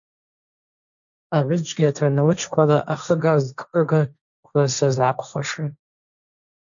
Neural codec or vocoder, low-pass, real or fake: codec, 16 kHz, 1.1 kbps, Voila-Tokenizer; 7.2 kHz; fake